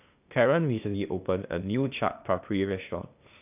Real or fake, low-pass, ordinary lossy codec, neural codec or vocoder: fake; 3.6 kHz; none; codec, 16 kHz, 0.8 kbps, ZipCodec